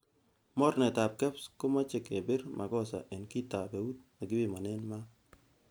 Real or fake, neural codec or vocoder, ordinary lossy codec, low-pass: real; none; none; none